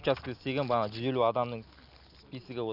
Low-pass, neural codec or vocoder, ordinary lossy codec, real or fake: 5.4 kHz; none; none; real